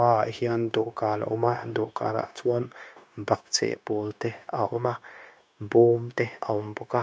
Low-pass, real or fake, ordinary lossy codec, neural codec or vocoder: none; fake; none; codec, 16 kHz, 0.9 kbps, LongCat-Audio-Codec